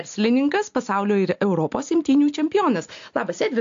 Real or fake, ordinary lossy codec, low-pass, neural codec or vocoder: real; AAC, 48 kbps; 7.2 kHz; none